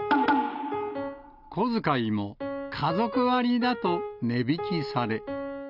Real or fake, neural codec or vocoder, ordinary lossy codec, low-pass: real; none; none; 5.4 kHz